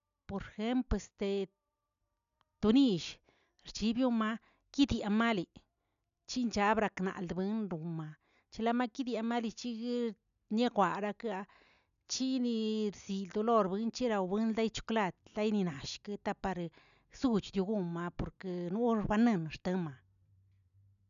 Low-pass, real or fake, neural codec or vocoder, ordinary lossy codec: 7.2 kHz; real; none; none